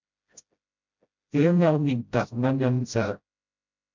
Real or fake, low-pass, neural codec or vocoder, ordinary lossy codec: fake; 7.2 kHz; codec, 16 kHz, 0.5 kbps, FreqCodec, smaller model; MP3, 64 kbps